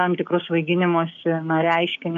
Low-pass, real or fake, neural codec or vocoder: 7.2 kHz; fake; codec, 16 kHz, 6 kbps, DAC